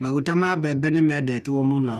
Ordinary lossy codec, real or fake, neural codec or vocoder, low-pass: none; fake; codec, 44.1 kHz, 2.6 kbps, DAC; 14.4 kHz